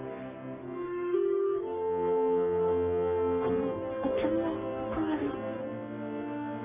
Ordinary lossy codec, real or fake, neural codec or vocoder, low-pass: none; fake; codec, 44.1 kHz, 3.4 kbps, Pupu-Codec; 3.6 kHz